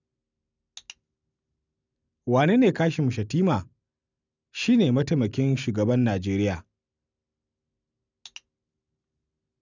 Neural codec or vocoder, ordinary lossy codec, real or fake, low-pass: none; none; real; 7.2 kHz